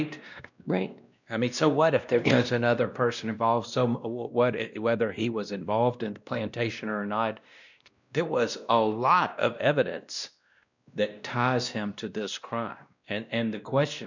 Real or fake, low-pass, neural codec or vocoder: fake; 7.2 kHz; codec, 16 kHz, 1 kbps, X-Codec, WavLM features, trained on Multilingual LibriSpeech